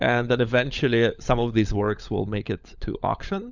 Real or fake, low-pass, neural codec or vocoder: fake; 7.2 kHz; codec, 16 kHz, 16 kbps, FunCodec, trained on LibriTTS, 50 frames a second